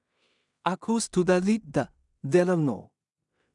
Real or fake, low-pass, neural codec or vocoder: fake; 10.8 kHz; codec, 16 kHz in and 24 kHz out, 0.4 kbps, LongCat-Audio-Codec, two codebook decoder